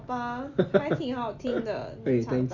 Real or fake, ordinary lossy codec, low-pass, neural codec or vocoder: real; none; 7.2 kHz; none